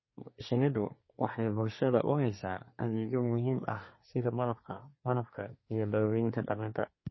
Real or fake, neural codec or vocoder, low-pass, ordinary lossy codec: fake; codec, 24 kHz, 1 kbps, SNAC; 7.2 kHz; MP3, 24 kbps